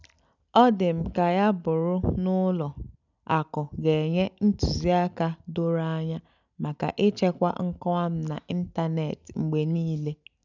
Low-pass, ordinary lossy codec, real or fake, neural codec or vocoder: 7.2 kHz; none; real; none